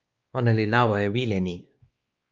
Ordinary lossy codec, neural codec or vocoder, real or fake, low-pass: Opus, 24 kbps; codec, 16 kHz, 2 kbps, X-Codec, WavLM features, trained on Multilingual LibriSpeech; fake; 7.2 kHz